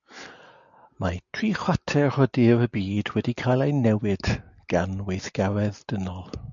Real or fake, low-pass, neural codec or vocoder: real; 7.2 kHz; none